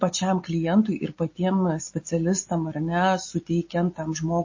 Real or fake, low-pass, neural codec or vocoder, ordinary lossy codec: real; 7.2 kHz; none; MP3, 32 kbps